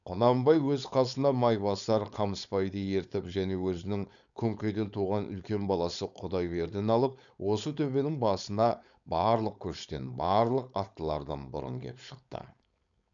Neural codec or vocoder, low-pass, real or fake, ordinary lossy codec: codec, 16 kHz, 4.8 kbps, FACodec; 7.2 kHz; fake; none